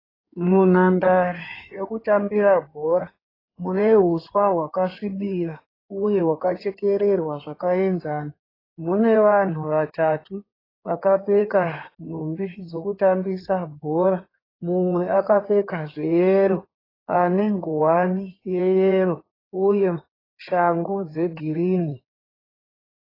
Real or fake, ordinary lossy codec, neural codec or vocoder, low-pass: fake; AAC, 24 kbps; codec, 16 kHz in and 24 kHz out, 2.2 kbps, FireRedTTS-2 codec; 5.4 kHz